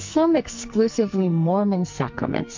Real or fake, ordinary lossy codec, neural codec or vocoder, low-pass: fake; MP3, 48 kbps; codec, 44.1 kHz, 2.6 kbps, SNAC; 7.2 kHz